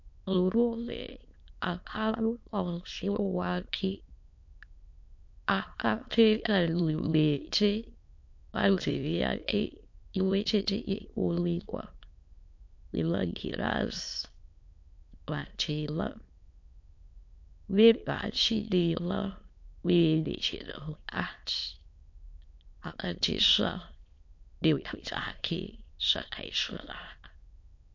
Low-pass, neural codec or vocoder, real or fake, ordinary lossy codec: 7.2 kHz; autoencoder, 22.05 kHz, a latent of 192 numbers a frame, VITS, trained on many speakers; fake; MP3, 48 kbps